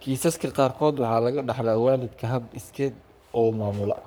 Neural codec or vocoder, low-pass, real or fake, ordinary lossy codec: codec, 44.1 kHz, 3.4 kbps, Pupu-Codec; none; fake; none